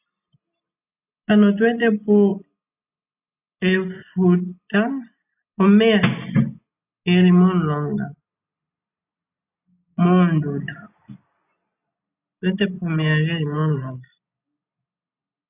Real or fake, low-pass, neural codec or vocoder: real; 3.6 kHz; none